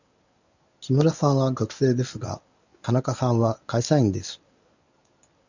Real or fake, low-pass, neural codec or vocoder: fake; 7.2 kHz; codec, 24 kHz, 0.9 kbps, WavTokenizer, medium speech release version 1